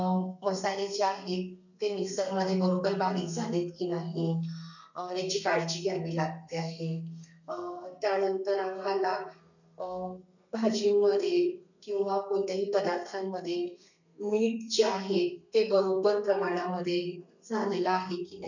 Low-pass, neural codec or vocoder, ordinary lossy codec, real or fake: 7.2 kHz; codec, 32 kHz, 1.9 kbps, SNAC; none; fake